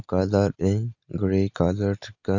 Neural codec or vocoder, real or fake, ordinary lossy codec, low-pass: none; real; none; 7.2 kHz